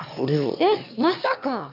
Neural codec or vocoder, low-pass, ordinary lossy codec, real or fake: autoencoder, 22.05 kHz, a latent of 192 numbers a frame, VITS, trained on one speaker; 5.4 kHz; AAC, 48 kbps; fake